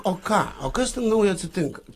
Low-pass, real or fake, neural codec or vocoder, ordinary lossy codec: 14.4 kHz; real; none; AAC, 48 kbps